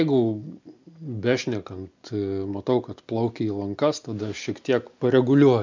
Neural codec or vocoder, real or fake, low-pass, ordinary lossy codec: none; real; 7.2 kHz; MP3, 64 kbps